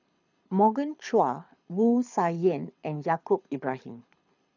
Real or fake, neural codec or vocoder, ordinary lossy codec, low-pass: fake; codec, 24 kHz, 6 kbps, HILCodec; none; 7.2 kHz